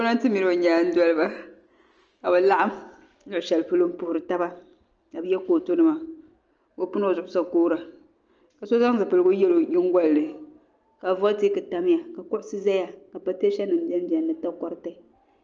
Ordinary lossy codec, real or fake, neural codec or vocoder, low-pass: Opus, 24 kbps; real; none; 7.2 kHz